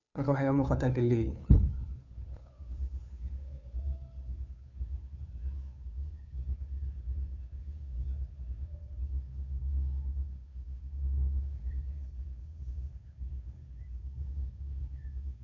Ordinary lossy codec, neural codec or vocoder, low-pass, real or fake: none; codec, 16 kHz, 2 kbps, FunCodec, trained on Chinese and English, 25 frames a second; 7.2 kHz; fake